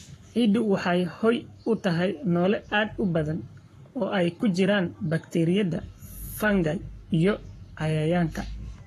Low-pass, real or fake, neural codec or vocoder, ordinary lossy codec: 14.4 kHz; fake; codec, 44.1 kHz, 7.8 kbps, Pupu-Codec; AAC, 48 kbps